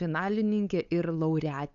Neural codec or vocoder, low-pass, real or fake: codec, 16 kHz, 8 kbps, FunCodec, trained on LibriTTS, 25 frames a second; 7.2 kHz; fake